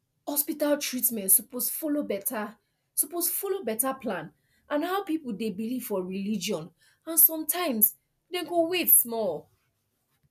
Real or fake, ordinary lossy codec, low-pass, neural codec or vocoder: real; none; 14.4 kHz; none